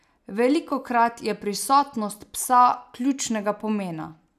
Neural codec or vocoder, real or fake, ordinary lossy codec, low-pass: none; real; none; 14.4 kHz